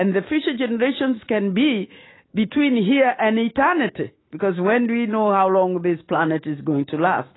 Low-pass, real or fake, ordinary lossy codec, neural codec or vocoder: 7.2 kHz; fake; AAC, 16 kbps; autoencoder, 48 kHz, 128 numbers a frame, DAC-VAE, trained on Japanese speech